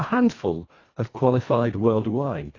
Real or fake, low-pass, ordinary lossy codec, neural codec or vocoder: fake; 7.2 kHz; AAC, 32 kbps; codec, 24 kHz, 1.5 kbps, HILCodec